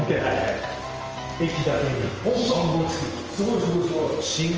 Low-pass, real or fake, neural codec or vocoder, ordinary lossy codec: 7.2 kHz; real; none; Opus, 24 kbps